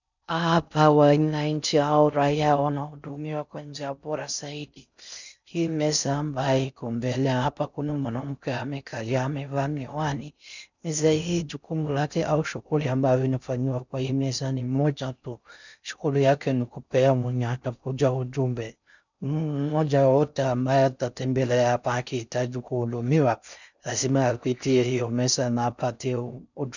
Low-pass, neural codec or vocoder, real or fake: 7.2 kHz; codec, 16 kHz in and 24 kHz out, 0.6 kbps, FocalCodec, streaming, 4096 codes; fake